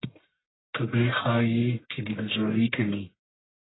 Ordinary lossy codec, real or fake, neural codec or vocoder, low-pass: AAC, 16 kbps; fake; codec, 44.1 kHz, 1.7 kbps, Pupu-Codec; 7.2 kHz